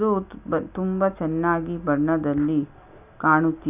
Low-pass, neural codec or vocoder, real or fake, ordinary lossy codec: 3.6 kHz; none; real; none